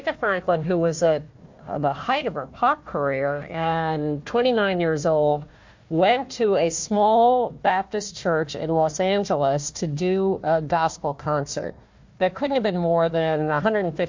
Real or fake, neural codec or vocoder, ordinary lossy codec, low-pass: fake; codec, 16 kHz, 1 kbps, FunCodec, trained on Chinese and English, 50 frames a second; MP3, 48 kbps; 7.2 kHz